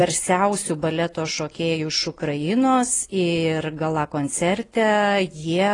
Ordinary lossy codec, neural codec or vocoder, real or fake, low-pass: AAC, 32 kbps; none; real; 10.8 kHz